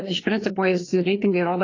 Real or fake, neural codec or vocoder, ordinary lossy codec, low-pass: fake; codec, 16 kHz, 2 kbps, FreqCodec, larger model; AAC, 32 kbps; 7.2 kHz